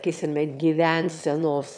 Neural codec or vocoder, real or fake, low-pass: autoencoder, 22.05 kHz, a latent of 192 numbers a frame, VITS, trained on one speaker; fake; 9.9 kHz